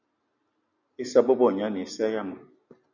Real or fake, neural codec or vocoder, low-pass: real; none; 7.2 kHz